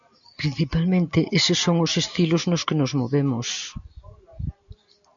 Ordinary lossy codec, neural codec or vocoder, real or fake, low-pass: MP3, 96 kbps; none; real; 7.2 kHz